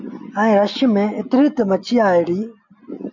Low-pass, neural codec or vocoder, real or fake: 7.2 kHz; none; real